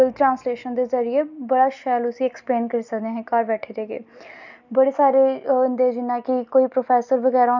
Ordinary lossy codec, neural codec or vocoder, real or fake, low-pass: none; none; real; 7.2 kHz